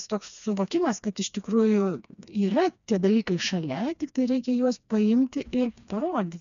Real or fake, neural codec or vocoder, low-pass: fake; codec, 16 kHz, 2 kbps, FreqCodec, smaller model; 7.2 kHz